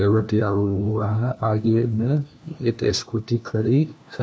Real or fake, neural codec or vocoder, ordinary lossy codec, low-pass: fake; codec, 16 kHz, 1 kbps, FunCodec, trained on LibriTTS, 50 frames a second; none; none